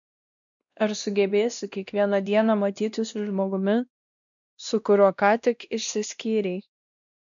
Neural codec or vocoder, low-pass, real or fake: codec, 16 kHz, 1 kbps, X-Codec, WavLM features, trained on Multilingual LibriSpeech; 7.2 kHz; fake